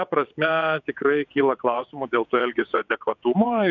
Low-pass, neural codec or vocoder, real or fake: 7.2 kHz; vocoder, 22.05 kHz, 80 mel bands, WaveNeXt; fake